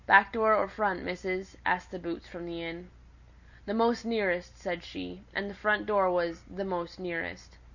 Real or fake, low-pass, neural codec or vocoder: real; 7.2 kHz; none